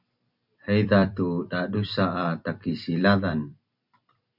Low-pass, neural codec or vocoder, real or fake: 5.4 kHz; vocoder, 44.1 kHz, 128 mel bands every 256 samples, BigVGAN v2; fake